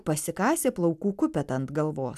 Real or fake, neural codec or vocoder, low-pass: real; none; 14.4 kHz